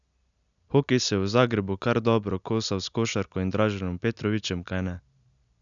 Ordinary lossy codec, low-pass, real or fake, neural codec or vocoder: none; 7.2 kHz; real; none